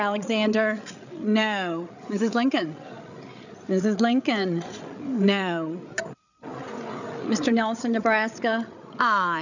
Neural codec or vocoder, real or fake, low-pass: codec, 16 kHz, 8 kbps, FreqCodec, larger model; fake; 7.2 kHz